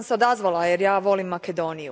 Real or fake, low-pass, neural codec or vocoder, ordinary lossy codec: real; none; none; none